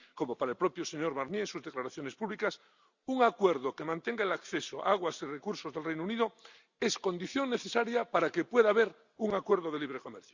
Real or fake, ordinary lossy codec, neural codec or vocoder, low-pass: real; Opus, 64 kbps; none; 7.2 kHz